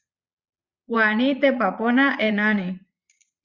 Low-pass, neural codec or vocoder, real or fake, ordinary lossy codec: 7.2 kHz; vocoder, 22.05 kHz, 80 mel bands, Vocos; fake; Opus, 64 kbps